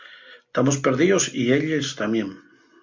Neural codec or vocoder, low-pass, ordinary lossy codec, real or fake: none; 7.2 kHz; MP3, 48 kbps; real